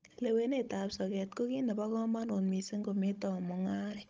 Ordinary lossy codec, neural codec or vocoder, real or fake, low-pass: Opus, 24 kbps; codec, 16 kHz, 16 kbps, FunCodec, trained on Chinese and English, 50 frames a second; fake; 7.2 kHz